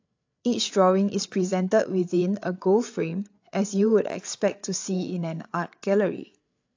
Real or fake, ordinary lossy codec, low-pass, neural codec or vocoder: fake; AAC, 48 kbps; 7.2 kHz; codec, 16 kHz, 8 kbps, FreqCodec, larger model